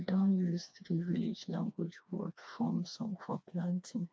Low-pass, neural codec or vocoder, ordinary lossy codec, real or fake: none; codec, 16 kHz, 2 kbps, FreqCodec, smaller model; none; fake